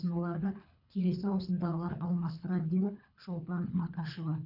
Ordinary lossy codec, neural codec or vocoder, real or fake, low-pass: none; codec, 24 kHz, 3 kbps, HILCodec; fake; 5.4 kHz